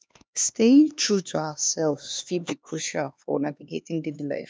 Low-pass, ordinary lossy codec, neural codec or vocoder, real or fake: none; none; codec, 16 kHz, 2 kbps, X-Codec, HuBERT features, trained on LibriSpeech; fake